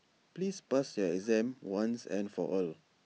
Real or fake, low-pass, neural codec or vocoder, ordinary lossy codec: real; none; none; none